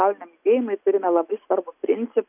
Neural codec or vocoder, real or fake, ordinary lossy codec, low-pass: none; real; AAC, 32 kbps; 3.6 kHz